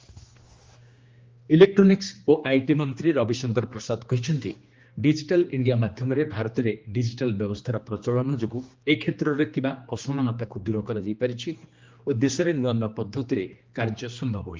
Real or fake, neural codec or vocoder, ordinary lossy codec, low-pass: fake; codec, 16 kHz, 2 kbps, X-Codec, HuBERT features, trained on general audio; Opus, 32 kbps; 7.2 kHz